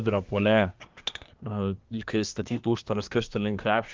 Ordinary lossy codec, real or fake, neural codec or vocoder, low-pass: Opus, 24 kbps; fake; codec, 24 kHz, 1 kbps, SNAC; 7.2 kHz